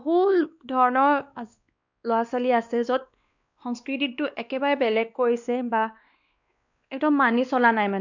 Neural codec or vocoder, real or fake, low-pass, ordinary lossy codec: codec, 16 kHz, 2 kbps, X-Codec, WavLM features, trained on Multilingual LibriSpeech; fake; 7.2 kHz; none